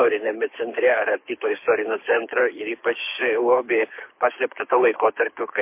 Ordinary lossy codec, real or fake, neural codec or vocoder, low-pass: MP3, 24 kbps; fake; codec, 24 kHz, 6 kbps, HILCodec; 3.6 kHz